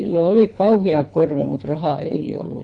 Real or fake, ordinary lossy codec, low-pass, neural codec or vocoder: fake; none; 9.9 kHz; codec, 24 kHz, 3 kbps, HILCodec